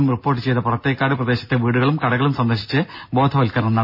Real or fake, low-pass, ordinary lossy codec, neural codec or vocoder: real; 5.4 kHz; none; none